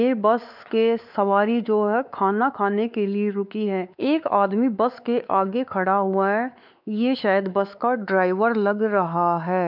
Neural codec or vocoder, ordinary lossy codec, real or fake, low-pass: codec, 16 kHz, 4 kbps, X-Codec, WavLM features, trained on Multilingual LibriSpeech; none; fake; 5.4 kHz